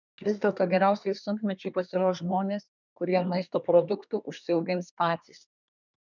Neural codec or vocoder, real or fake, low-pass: codec, 24 kHz, 1 kbps, SNAC; fake; 7.2 kHz